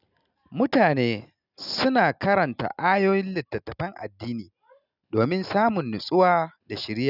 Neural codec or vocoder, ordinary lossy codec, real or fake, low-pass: none; none; real; 5.4 kHz